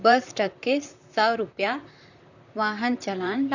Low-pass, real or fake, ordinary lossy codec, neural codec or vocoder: 7.2 kHz; fake; none; vocoder, 44.1 kHz, 128 mel bands, Pupu-Vocoder